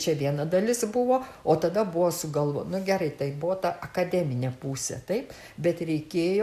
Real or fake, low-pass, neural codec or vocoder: real; 14.4 kHz; none